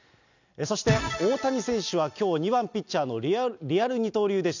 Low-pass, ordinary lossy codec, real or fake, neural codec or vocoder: 7.2 kHz; none; real; none